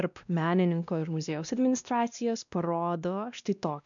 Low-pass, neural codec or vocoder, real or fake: 7.2 kHz; codec, 16 kHz, 1 kbps, X-Codec, WavLM features, trained on Multilingual LibriSpeech; fake